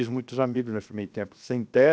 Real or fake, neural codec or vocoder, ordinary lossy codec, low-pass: fake; codec, 16 kHz, 0.7 kbps, FocalCodec; none; none